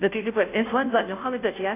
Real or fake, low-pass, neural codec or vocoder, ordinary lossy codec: fake; 3.6 kHz; codec, 16 kHz, 0.5 kbps, FunCodec, trained on Chinese and English, 25 frames a second; AAC, 24 kbps